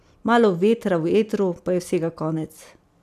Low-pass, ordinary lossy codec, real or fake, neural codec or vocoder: 14.4 kHz; none; real; none